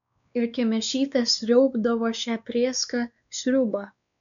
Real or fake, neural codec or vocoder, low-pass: fake; codec, 16 kHz, 2 kbps, X-Codec, WavLM features, trained on Multilingual LibriSpeech; 7.2 kHz